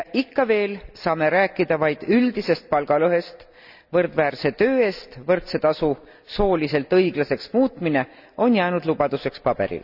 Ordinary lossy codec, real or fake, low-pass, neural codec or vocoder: none; real; 5.4 kHz; none